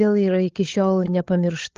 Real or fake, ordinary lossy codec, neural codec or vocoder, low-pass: fake; Opus, 16 kbps; codec, 16 kHz, 8 kbps, FreqCodec, larger model; 7.2 kHz